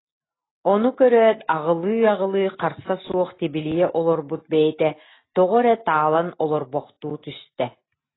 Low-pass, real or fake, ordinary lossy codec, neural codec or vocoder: 7.2 kHz; real; AAC, 16 kbps; none